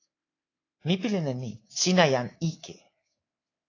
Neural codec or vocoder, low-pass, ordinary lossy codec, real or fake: codec, 24 kHz, 3.1 kbps, DualCodec; 7.2 kHz; AAC, 32 kbps; fake